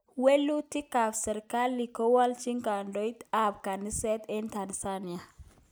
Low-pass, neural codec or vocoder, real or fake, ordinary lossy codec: none; none; real; none